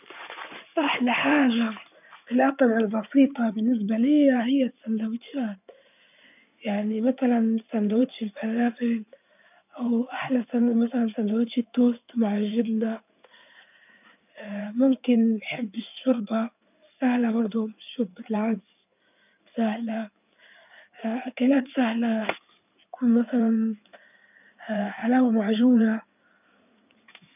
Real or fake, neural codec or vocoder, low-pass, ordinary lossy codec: fake; codec, 16 kHz in and 24 kHz out, 2.2 kbps, FireRedTTS-2 codec; 3.6 kHz; none